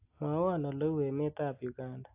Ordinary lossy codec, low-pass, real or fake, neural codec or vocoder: AAC, 32 kbps; 3.6 kHz; real; none